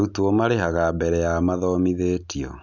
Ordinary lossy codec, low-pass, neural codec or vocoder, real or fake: none; 7.2 kHz; none; real